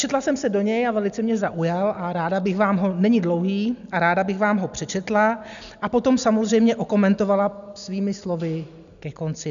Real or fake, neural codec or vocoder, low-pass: real; none; 7.2 kHz